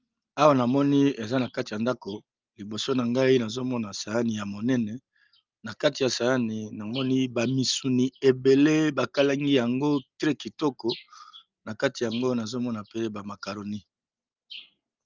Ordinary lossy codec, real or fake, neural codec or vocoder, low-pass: Opus, 24 kbps; real; none; 7.2 kHz